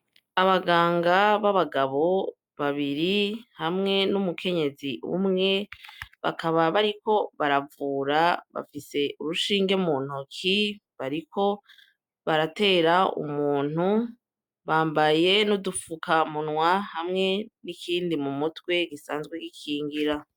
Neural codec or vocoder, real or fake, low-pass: none; real; 19.8 kHz